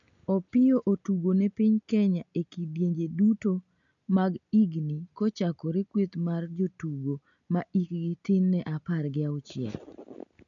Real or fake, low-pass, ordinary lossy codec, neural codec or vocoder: real; 7.2 kHz; none; none